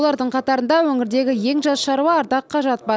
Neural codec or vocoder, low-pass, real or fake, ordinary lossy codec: none; none; real; none